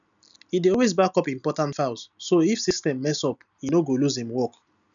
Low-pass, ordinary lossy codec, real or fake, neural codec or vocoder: 7.2 kHz; none; real; none